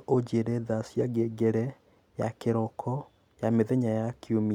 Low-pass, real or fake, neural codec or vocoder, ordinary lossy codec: 19.8 kHz; real; none; none